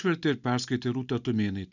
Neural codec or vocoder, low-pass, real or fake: none; 7.2 kHz; real